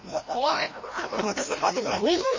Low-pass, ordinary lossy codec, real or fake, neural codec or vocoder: 7.2 kHz; MP3, 32 kbps; fake; codec, 16 kHz, 1 kbps, FreqCodec, larger model